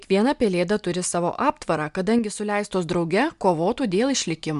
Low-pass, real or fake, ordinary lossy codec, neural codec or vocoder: 10.8 kHz; real; MP3, 96 kbps; none